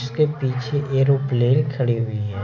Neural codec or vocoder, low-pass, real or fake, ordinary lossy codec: none; 7.2 kHz; real; none